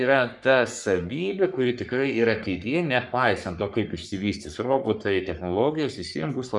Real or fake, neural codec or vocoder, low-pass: fake; codec, 44.1 kHz, 3.4 kbps, Pupu-Codec; 10.8 kHz